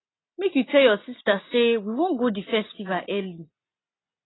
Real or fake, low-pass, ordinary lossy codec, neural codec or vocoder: real; 7.2 kHz; AAC, 16 kbps; none